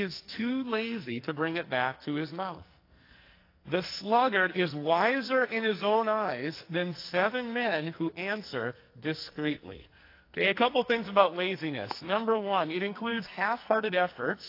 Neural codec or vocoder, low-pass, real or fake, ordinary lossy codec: codec, 44.1 kHz, 2.6 kbps, SNAC; 5.4 kHz; fake; AAC, 32 kbps